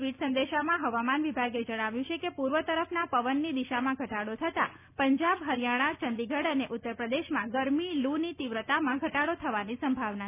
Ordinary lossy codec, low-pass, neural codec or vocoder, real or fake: none; 3.6 kHz; none; real